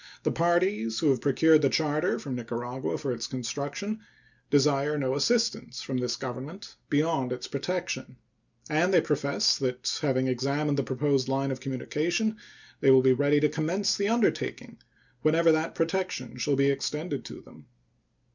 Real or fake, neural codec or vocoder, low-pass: real; none; 7.2 kHz